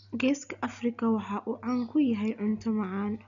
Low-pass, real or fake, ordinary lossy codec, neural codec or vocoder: 7.2 kHz; real; none; none